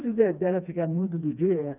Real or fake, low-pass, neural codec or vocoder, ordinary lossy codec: fake; 3.6 kHz; codec, 16 kHz, 2 kbps, FreqCodec, smaller model; none